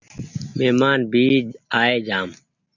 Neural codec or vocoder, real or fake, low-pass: none; real; 7.2 kHz